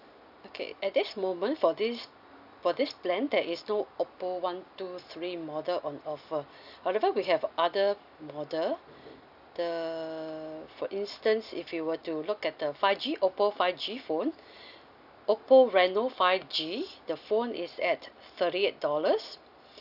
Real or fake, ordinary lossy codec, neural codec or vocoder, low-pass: real; none; none; 5.4 kHz